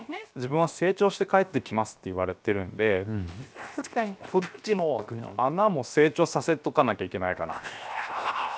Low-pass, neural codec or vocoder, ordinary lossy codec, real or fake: none; codec, 16 kHz, 0.7 kbps, FocalCodec; none; fake